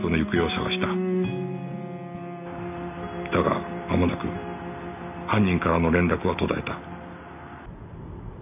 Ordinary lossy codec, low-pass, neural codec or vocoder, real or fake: none; 3.6 kHz; none; real